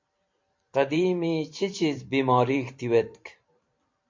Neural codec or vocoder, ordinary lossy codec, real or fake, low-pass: vocoder, 44.1 kHz, 128 mel bands every 512 samples, BigVGAN v2; MP3, 48 kbps; fake; 7.2 kHz